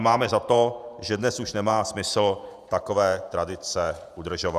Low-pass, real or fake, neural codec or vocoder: 14.4 kHz; fake; vocoder, 48 kHz, 128 mel bands, Vocos